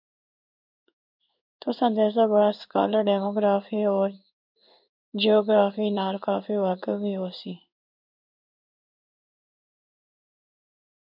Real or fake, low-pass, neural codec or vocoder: fake; 5.4 kHz; codec, 16 kHz in and 24 kHz out, 1 kbps, XY-Tokenizer